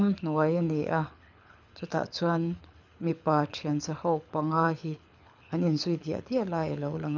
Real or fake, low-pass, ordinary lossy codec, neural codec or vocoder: fake; 7.2 kHz; none; codec, 24 kHz, 6 kbps, HILCodec